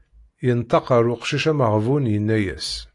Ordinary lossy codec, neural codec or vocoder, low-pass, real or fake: AAC, 48 kbps; none; 10.8 kHz; real